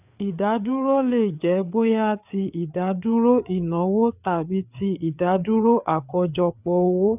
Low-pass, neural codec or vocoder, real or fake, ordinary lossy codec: 3.6 kHz; codec, 16 kHz, 4 kbps, FreqCodec, larger model; fake; none